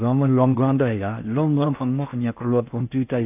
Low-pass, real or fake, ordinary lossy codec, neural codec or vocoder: 3.6 kHz; fake; AAC, 32 kbps; codec, 16 kHz in and 24 kHz out, 0.6 kbps, FocalCodec, streaming, 4096 codes